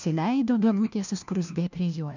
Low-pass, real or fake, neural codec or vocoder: 7.2 kHz; fake; codec, 16 kHz, 1 kbps, FunCodec, trained on LibriTTS, 50 frames a second